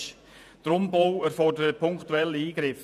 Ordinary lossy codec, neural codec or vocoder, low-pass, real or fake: none; vocoder, 48 kHz, 128 mel bands, Vocos; 14.4 kHz; fake